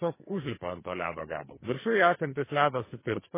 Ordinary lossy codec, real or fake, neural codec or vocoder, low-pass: MP3, 16 kbps; fake; codec, 44.1 kHz, 2.6 kbps, SNAC; 3.6 kHz